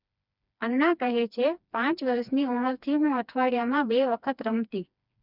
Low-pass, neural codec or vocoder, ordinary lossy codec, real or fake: 5.4 kHz; codec, 16 kHz, 2 kbps, FreqCodec, smaller model; none; fake